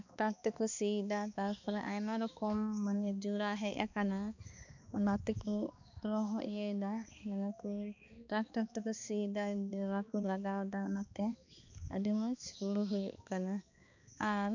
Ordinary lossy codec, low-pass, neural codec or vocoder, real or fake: none; 7.2 kHz; codec, 16 kHz, 2 kbps, X-Codec, HuBERT features, trained on balanced general audio; fake